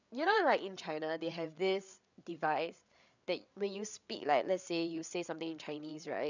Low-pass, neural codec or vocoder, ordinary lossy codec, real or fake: 7.2 kHz; codec, 16 kHz, 8 kbps, FreqCodec, larger model; none; fake